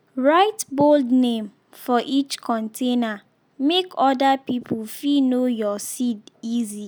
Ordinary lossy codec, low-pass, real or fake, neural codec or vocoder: none; none; real; none